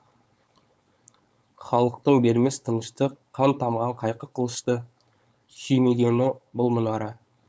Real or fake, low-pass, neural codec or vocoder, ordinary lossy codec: fake; none; codec, 16 kHz, 4.8 kbps, FACodec; none